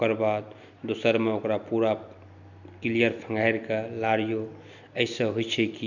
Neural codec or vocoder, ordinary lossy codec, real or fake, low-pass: none; none; real; 7.2 kHz